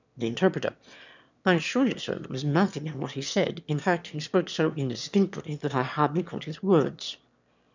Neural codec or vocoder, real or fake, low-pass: autoencoder, 22.05 kHz, a latent of 192 numbers a frame, VITS, trained on one speaker; fake; 7.2 kHz